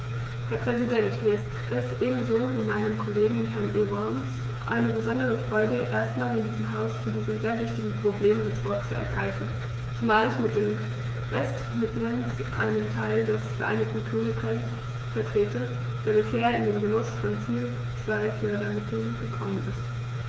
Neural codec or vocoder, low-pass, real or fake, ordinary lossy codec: codec, 16 kHz, 4 kbps, FreqCodec, smaller model; none; fake; none